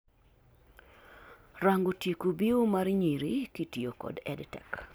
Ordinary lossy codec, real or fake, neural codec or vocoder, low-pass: none; real; none; none